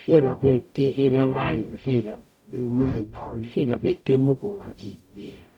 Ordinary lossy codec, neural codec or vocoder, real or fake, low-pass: none; codec, 44.1 kHz, 0.9 kbps, DAC; fake; 19.8 kHz